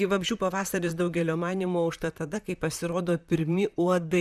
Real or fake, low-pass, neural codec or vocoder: fake; 14.4 kHz; vocoder, 44.1 kHz, 128 mel bands, Pupu-Vocoder